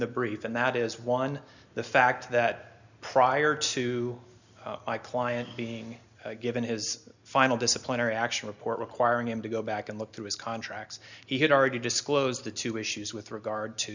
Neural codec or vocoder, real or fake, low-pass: none; real; 7.2 kHz